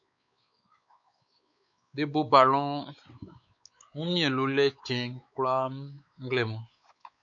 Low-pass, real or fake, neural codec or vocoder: 7.2 kHz; fake; codec, 16 kHz, 4 kbps, X-Codec, WavLM features, trained on Multilingual LibriSpeech